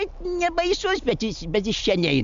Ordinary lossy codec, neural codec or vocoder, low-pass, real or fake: MP3, 64 kbps; none; 7.2 kHz; real